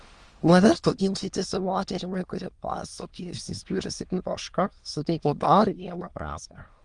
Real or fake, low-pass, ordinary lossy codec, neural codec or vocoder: fake; 9.9 kHz; Opus, 24 kbps; autoencoder, 22.05 kHz, a latent of 192 numbers a frame, VITS, trained on many speakers